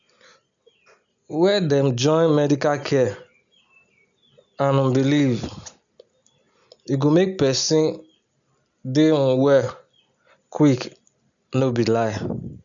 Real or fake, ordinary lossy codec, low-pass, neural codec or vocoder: real; none; 7.2 kHz; none